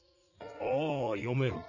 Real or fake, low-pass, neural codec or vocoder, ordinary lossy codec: fake; 7.2 kHz; vocoder, 44.1 kHz, 80 mel bands, Vocos; none